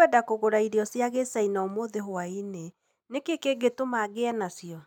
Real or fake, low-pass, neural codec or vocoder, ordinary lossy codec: real; 19.8 kHz; none; none